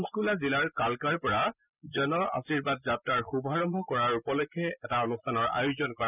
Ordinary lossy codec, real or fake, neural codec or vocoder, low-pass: none; real; none; 3.6 kHz